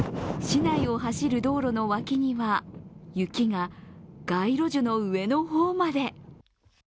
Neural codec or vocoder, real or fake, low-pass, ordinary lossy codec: none; real; none; none